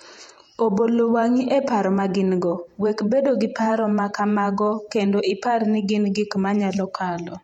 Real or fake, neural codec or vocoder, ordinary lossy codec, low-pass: fake; vocoder, 48 kHz, 128 mel bands, Vocos; MP3, 64 kbps; 19.8 kHz